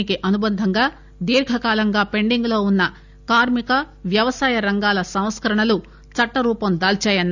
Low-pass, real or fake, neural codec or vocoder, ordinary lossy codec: 7.2 kHz; real; none; none